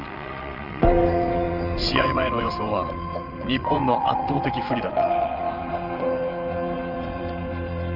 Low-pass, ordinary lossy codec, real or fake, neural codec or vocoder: 5.4 kHz; Opus, 32 kbps; fake; vocoder, 22.05 kHz, 80 mel bands, WaveNeXt